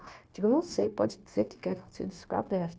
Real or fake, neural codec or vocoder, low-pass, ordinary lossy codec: fake; codec, 16 kHz, 0.9 kbps, LongCat-Audio-Codec; none; none